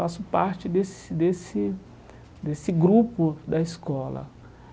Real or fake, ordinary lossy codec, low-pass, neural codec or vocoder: real; none; none; none